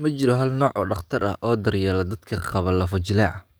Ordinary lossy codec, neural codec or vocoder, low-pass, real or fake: none; codec, 44.1 kHz, 7.8 kbps, DAC; none; fake